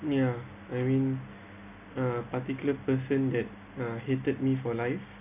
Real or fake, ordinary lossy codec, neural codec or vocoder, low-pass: real; none; none; 3.6 kHz